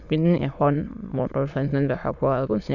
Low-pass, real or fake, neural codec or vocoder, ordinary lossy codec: 7.2 kHz; fake; autoencoder, 22.05 kHz, a latent of 192 numbers a frame, VITS, trained on many speakers; none